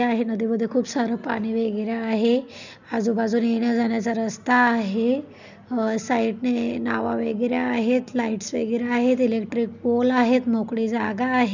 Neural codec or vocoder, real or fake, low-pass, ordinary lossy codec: none; real; 7.2 kHz; none